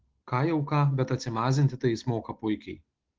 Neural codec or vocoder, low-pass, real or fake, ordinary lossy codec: none; 7.2 kHz; real; Opus, 16 kbps